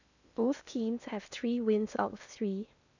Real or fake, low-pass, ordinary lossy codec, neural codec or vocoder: fake; 7.2 kHz; none; codec, 16 kHz in and 24 kHz out, 0.8 kbps, FocalCodec, streaming, 65536 codes